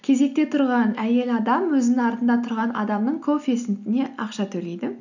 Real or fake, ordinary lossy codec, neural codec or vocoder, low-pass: real; none; none; 7.2 kHz